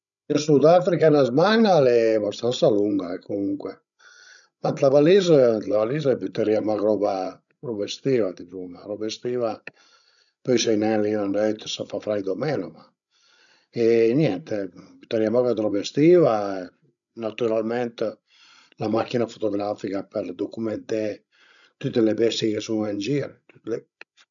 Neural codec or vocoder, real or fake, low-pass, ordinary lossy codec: codec, 16 kHz, 16 kbps, FreqCodec, larger model; fake; 7.2 kHz; none